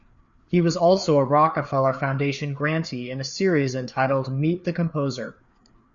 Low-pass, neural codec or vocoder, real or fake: 7.2 kHz; codec, 16 kHz, 4 kbps, FreqCodec, larger model; fake